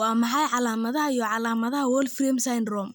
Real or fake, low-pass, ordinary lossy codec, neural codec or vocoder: real; none; none; none